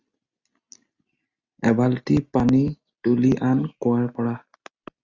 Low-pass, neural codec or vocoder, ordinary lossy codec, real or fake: 7.2 kHz; none; Opus, 64 kbps; real